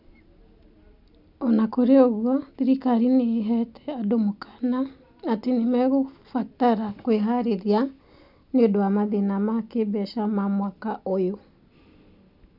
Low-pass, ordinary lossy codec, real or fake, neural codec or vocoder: 5.4 kHz; none; real; none